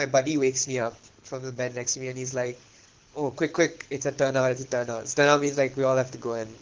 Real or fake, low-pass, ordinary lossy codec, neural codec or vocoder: fake; 7.2 kHz; Opus, 16 kbps; codec, 24 kHz, 6 kbps, HILCodec